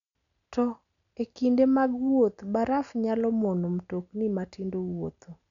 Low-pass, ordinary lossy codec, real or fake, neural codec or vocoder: 7.2 kHz; none; real; none